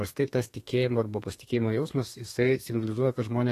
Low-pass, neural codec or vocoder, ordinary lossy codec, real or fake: 14.4 kHz; codec, 32 kHz, 1.9 kbps, SNAC; AAC, 48 kbps; fake